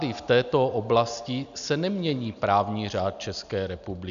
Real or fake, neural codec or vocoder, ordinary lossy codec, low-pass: real; none; MP3, 96 kbps; 7.2 kHz